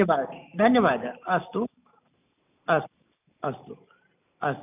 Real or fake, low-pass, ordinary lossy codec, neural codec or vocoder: real; 3.6 kHz; none; none